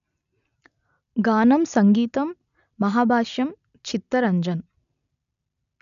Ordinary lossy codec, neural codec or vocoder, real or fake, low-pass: none; none; real; 7.2 kHz